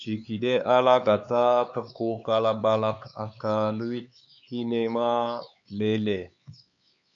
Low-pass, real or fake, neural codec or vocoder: 7.2 kHz; fake; codec, 16 kHz, 4 kbps, X-Codec, HuBERT features, trained on LibriSpeech